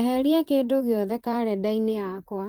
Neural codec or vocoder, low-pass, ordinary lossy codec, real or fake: vocoder, 44.1 kHz, 128 mel bands, Pupu-Vocoder; 19.8 kHz; Opus, 24 kbps; fake